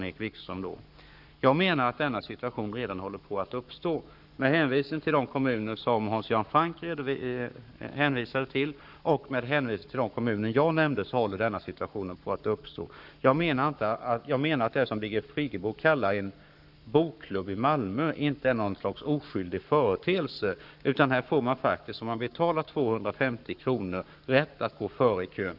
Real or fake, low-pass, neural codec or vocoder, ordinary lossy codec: fake; 5.4 kHz; codec, 44.1 kHz, 7.8 kbps, Pupu-Codec; none